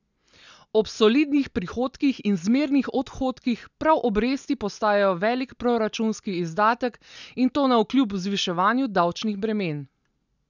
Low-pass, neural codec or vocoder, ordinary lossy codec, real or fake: 7.2 kHz; none; none; real